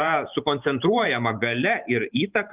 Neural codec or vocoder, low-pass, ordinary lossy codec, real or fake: vocoder, 44.1 kHz, 128 mel bands every 512 samples, BigVGAN v2; 3.6 kHz; Opus, 32 kbps; fake